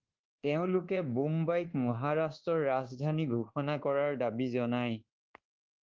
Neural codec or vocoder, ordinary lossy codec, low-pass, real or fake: codec, 24 kHz, 1.2 kbps, DualCodec; Opus, 16 kbps; 7.2 kHz; fake